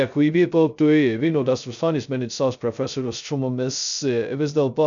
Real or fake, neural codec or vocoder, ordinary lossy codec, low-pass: fake; codec, 16 kHz, 0.2 kbps, FocalCodec; MP3, 96 kbps; 7.2 kHz